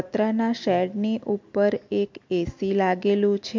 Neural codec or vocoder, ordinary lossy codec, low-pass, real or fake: none; MP3, 48 kbps; 7.2 kHz; real